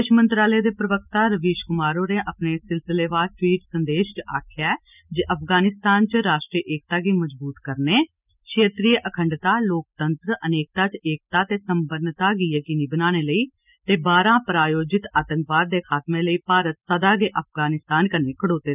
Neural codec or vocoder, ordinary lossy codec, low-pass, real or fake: none; none; 3.6 kHz; real